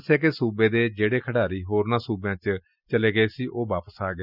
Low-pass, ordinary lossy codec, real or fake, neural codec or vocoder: 5.4 kHz; none; real; none